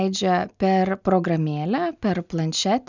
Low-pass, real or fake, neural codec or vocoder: 7.2 kHz; real; none